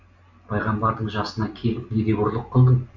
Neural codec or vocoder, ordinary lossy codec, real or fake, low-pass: none; none; real; 7.2 kHz